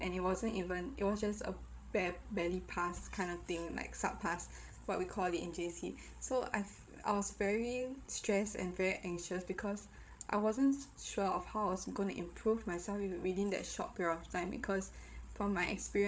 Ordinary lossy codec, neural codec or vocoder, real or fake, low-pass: none; codec, 16 kHz, 8 kbps, FreqCodec, larger model; fake; none